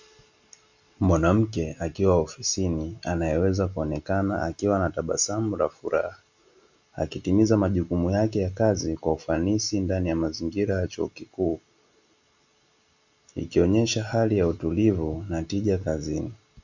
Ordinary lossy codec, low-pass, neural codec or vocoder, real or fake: Opus, 64 kbps; 7.2 kHz; none; real